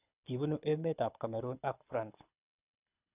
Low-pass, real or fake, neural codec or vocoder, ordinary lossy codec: 3.6 kHz; fake; codec, 24 kHz, 6 kbps, HILCodec; none